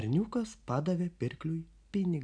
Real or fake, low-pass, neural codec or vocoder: real; 9.9 kHz; none